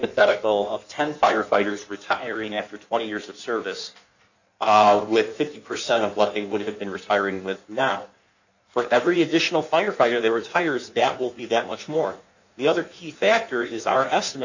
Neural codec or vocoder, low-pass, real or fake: codec, 16 kHz in and 24 kHz out, 1.1 kbps, FireRedTTS-2 codec; 7.2 kHz; fake